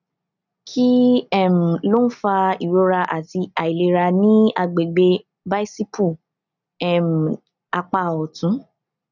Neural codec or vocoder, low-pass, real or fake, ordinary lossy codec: none; 7.2 kHz; real; none